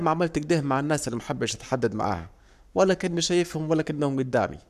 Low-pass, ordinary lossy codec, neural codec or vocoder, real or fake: 14.4 kHz; none; codec, 44.1 kHz, 7.8 kbps, Pupu-Codec; fake